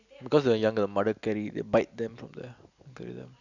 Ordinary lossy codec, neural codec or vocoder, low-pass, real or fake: none; none; 7.2 kHz; real